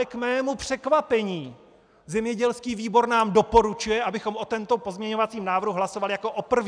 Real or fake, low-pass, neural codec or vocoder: real; 9.9 kHz; none